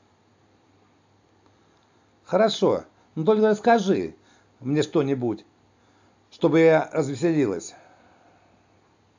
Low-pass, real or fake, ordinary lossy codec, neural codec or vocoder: 7.2 kHz; real; AAC, 48 kbps; none